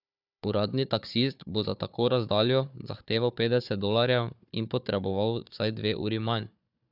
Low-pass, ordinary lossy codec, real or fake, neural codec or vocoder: 5.4 kHz; none; fake; codec, 16 kHz, 4 kbps, FunCodec, trained on Chinese and English, 50 frames a second